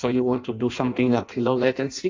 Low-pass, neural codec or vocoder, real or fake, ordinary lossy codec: 7.2 kHz; codec, 16 kHz in and 24 kHz out, 0.6 kbps, FireRedTTS-2 codec; fake; AAC, 48 kbps